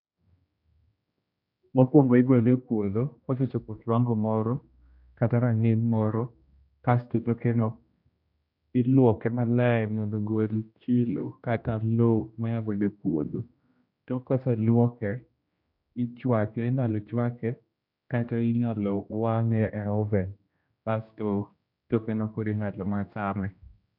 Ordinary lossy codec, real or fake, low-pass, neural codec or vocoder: none; fake; 5.4 kHz; codec, 16 kHz, 1 kbps, X-Codec, HuBERT features, trained on general audio